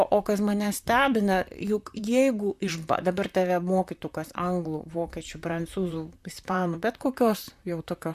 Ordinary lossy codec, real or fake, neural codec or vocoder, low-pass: MP3, 96 kbps; fake; vocoder, 44.1 kHz, 128 mel bands, Pupu-Vocoder; 14.4 kHz